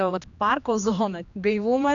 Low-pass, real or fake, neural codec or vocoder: 7.2 kHz; fake; codec, 16 kHz, 2 kbps, X-Codec, HuBERT features, trained on general audio